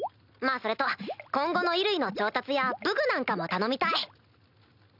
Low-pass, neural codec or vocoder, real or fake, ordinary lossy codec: 5.4 kHz; none; real; none